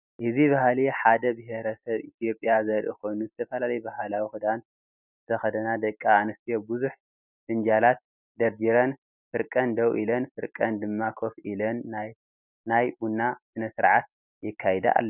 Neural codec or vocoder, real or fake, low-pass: none; real; 3.6 kHz